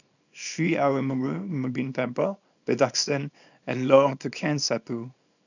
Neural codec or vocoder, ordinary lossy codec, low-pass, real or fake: codec, 24 kHz, 0.9 kbps, WavTokenizer, small release; none; 7.2 kHz; fake